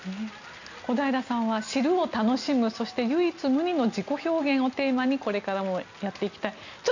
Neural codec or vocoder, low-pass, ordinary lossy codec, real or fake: none; 7.2 kHz; none; real